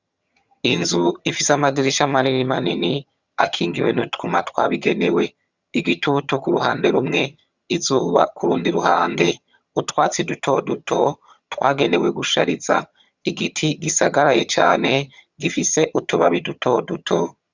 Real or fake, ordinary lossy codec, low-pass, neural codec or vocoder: fake; Opus, 64 kbps; 7.2 kHz; vocoder, 22.05 kHz, 80 mel bands, HiFi-GAN